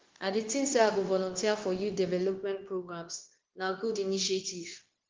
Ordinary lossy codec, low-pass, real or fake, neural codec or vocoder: Opus, 16 kbps; 7.2 kHz; fake; codec, 16 kHz, 0.9 kbps, LongCat-Audio-Codec